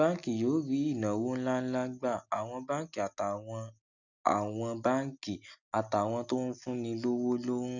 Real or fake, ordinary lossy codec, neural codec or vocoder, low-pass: real; none; none; 7.2 kHz